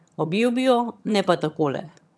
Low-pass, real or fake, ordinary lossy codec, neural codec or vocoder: none; fake; none; vocoder, 22.05 kHz, 80 mel bands, HiFi-GAN